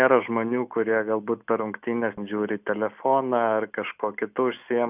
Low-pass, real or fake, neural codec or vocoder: 3.6 kHz; real; none